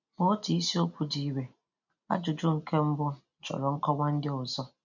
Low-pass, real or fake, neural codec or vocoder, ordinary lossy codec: 7.2 kHz; real; none; none